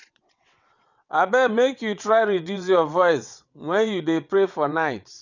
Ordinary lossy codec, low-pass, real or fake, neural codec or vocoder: none; 7.2 kHz; fake; vocoder, 22.05 kHz, 80 mel bands, WaveNeXt